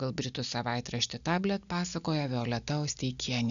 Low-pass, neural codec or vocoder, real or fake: 7.2 kHz; none; real